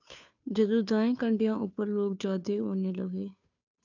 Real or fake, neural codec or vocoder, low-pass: fake; codec, 16 kHz, 2 kbps, FunCodec, trained on Chinese and English, 25 frames a second; 7.2 kHz